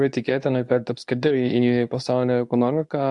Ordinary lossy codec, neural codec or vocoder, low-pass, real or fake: AAC, 64 kbps; codec, 24 kHz, 0.9 kbps, WavTokenizer, medium speech release version 2; 10.8 kHz; fake